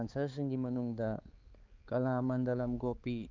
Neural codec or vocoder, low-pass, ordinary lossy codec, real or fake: codec, 16 kHz, 4 kbps, X-Codec, HuBERT features, trained on balanced general audio; 7.2 kHz; Opus, 32 kbps; fake